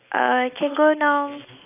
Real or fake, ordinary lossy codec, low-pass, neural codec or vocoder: real; AAC, 24 kbps; 3.6 kHz; none